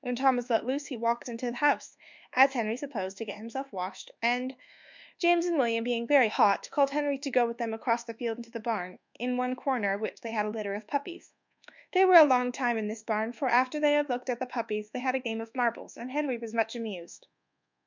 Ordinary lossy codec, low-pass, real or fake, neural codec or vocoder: MP3, 64 kbps; 7.2 kHz; fake; codec, 24 kHz, 1.2 kbps, DualCodec